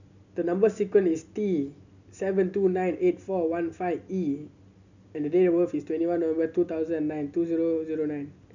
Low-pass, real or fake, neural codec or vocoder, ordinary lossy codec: 7.2 kHz; real; none; none